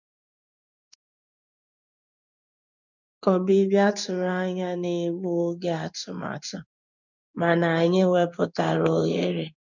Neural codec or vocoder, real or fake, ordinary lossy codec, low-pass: codec, 16 kHz in and 24 kHz out, 1 kbps, XY-Tokenizer; fake; none; 7.2 kHz